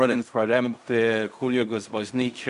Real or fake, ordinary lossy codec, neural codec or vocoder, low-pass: fake; MP3, 96 kbps; codec, 16 kHz in and 24 kHz out, 0.4 kbps, LongCat-Audio-Codec, fine tuned four codebook decoder; 10.8 kHz